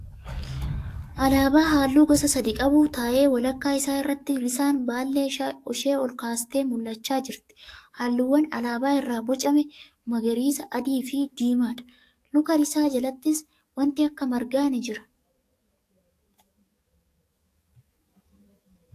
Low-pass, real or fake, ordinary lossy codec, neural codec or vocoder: 14.4 kHz; fake; AAC, 64 kbps; codec, 44.1 kHz, 7.8 kbps, DAC